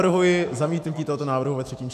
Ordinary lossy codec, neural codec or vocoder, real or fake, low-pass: MP3, 96 kbps; autoencoder, 48 kHz, 128 numbers a frame, DAC-VAE, trained on Japanese speech; fake; 14.4 kHz